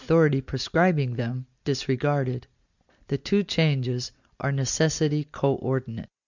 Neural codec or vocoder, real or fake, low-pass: none; real; 7.2 kHz